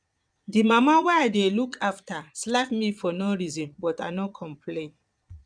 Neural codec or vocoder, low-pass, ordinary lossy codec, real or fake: vocoder, 22.05 kHz, 80 mel bands, Vocos; 9.9 kHz; none; fake